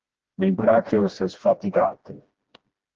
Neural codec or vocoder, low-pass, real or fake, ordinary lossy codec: codec, 16 kHz, 1 kbps, FreqCodec, smaller model; 7.2 kHz; fake; Opus, 16 kbps